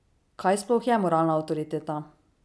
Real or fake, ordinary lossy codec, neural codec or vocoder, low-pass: real; none; none; none